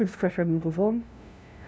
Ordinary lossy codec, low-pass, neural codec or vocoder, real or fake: none; none; codec, 16 kHz, 0.5 kbps, FunCodec, trained on LibriTTS, 25 frames a second; fake